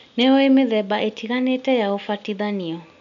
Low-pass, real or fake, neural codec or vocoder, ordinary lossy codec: 7.2 kHz; real; none; none